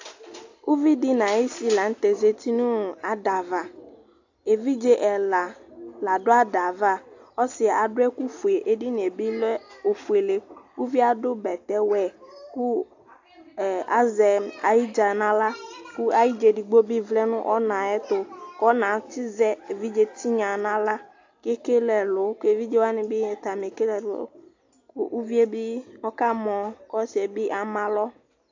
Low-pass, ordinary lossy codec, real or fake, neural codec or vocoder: 7.2 kHz; AAC, 48 kbps; real; none